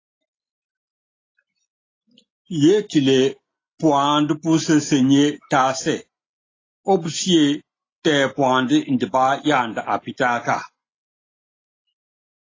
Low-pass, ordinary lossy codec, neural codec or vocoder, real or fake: 7.2 kHz; AAC, 32 kbps; none; real